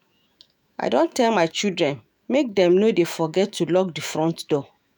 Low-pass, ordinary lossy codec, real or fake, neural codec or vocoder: none; none; fake; autoencoder, 48 kHz, 128 numbers a frame, DAC-VAE, trained on Japanese speech